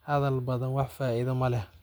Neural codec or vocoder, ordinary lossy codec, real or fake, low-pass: none; none; real; none